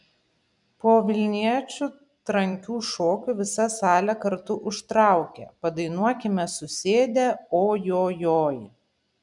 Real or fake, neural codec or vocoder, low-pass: fake; vocoder, 44.1 kHz, 128 mel bands every 256 samples, BigVGAN v2; 10.8 kHz